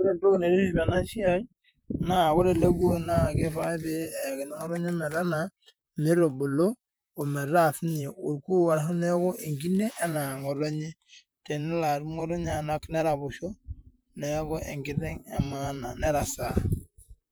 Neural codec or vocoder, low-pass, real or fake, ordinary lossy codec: vocoder, 44.1 kHz, 128 mel bands, Pupu-Vocoder; none; fake; none